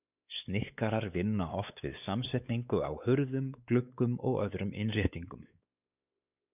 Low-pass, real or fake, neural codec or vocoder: 3.6 kHz; fake; codec, 16 kHz, 4 kbps, X-Codec, WavLM features, trained on Multilingual LibriSpeech